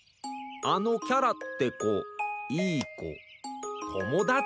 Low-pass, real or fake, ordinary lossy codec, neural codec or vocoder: none; real; none; none